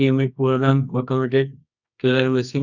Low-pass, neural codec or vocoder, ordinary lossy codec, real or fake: 7.2 kHz; codec, 24 kHz, 0.9 kbps, WavTokenizer, medium music audio release; none; fake